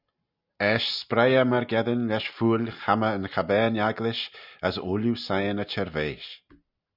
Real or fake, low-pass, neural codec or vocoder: real; 5.4 kHz; none